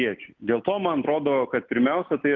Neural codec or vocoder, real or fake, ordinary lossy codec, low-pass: none; real; Opus, 24 kbps; 7.2 kHz